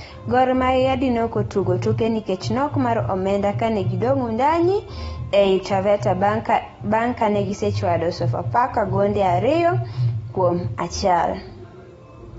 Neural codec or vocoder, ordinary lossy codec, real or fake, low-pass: none; AAC, 24 kbps; real; 14.4 kHz